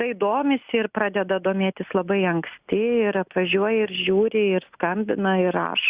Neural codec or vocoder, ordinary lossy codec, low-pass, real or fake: none; Opus, 64 kbps; 3.6 kHz; real